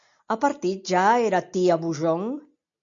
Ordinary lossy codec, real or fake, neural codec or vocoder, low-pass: MP3, 64 kbps; real; none; 7.2 kHz